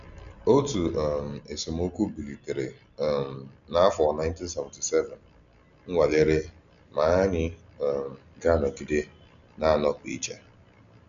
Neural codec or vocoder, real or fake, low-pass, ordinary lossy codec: none; real; 7.2 kHz; none